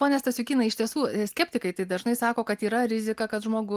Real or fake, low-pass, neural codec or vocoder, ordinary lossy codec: real; 14.4 kHz; none; Opus, 24 kbps